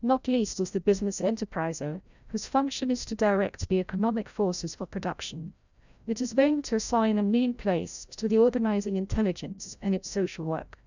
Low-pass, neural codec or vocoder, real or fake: 7.2 kHz; codec, 16 kHz, 0.5 kbps, FreqCodec, larger model; fake